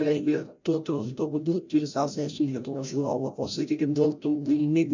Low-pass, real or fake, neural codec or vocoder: 7.2 kHz; fake; codec, 16 kHz, 0.5 kbps, FreqCodec, larger model